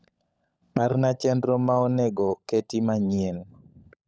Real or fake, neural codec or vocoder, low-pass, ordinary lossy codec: fake; codec, 16 kHz, 16 kbps, FunCodec, trained on LibriTTS, 50 frames a second; none; none